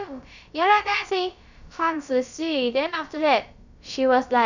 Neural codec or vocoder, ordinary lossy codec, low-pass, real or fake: codec, 16 kHz, about 1 kbps, DyCAST, with the encoder's durations; none; 7.2 kHz; fake